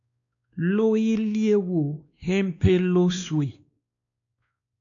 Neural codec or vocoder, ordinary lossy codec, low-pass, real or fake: codec, 16 kHz, 2 kbps, X-Codec, WavLM features, trained on Multilingual LibriSpeech; MP3, 96 kbps; 7.2 kHz; fake